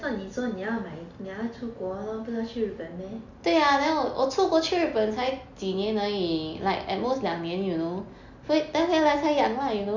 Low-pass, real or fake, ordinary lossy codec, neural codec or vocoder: 7.2 kHz; real; none; none